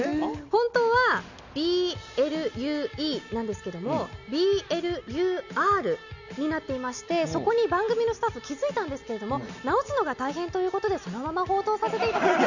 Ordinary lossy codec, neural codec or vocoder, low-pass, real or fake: none; none; 7.2 kHz; real